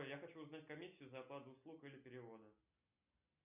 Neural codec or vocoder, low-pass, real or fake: none; 3.6 kHz; real